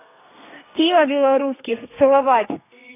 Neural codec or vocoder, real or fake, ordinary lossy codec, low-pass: codec, 32 kHz, 1.9 kbps, SNAC; fake; AAC, 24 kbps; 3.6 kHz